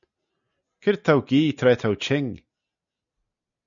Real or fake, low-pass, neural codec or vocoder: real; 7.2 kHz; none